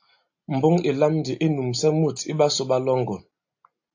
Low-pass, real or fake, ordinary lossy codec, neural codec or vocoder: 7.2 kHz; real; AAC, 48 kbps; none